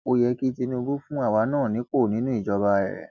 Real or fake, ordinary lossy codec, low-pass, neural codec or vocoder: real; none; 7.2 kHz; none